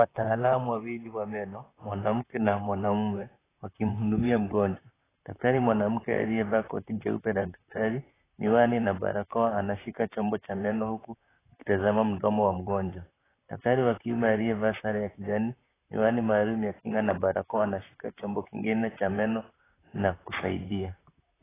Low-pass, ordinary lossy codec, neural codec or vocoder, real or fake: 3.6 kHz; AAC, 16 kbps; vocoder, 44.1 kHz, 128 mel bands, Pupu-Vocoder; fake